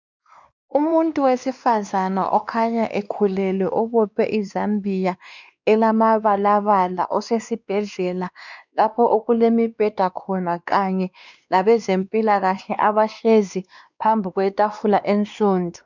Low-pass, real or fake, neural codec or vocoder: 7.2 kHz; fake; codec, 16 kHz, 2 kbps, X-Codec, WavLM features, trained on Multilingual LibriSpeech